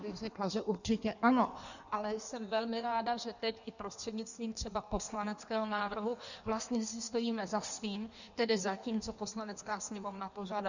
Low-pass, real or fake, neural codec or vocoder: 7.2 kHz; fake; codec, 16 kHz in and 24 kHz out, 1.1 kbps, FireRedTTS-2 codec